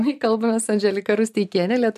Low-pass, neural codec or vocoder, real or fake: 14.4 kHz; none; real